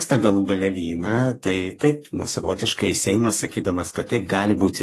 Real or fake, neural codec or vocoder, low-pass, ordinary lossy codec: fake; codec, 32 kHz, 1.9 kbps, SNAC; 14.4 kHz; AAC, 48 kbps